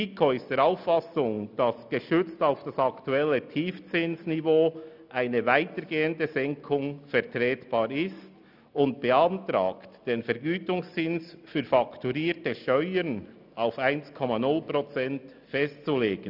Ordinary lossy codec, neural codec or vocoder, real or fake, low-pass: none; none; real; 5.4 kHz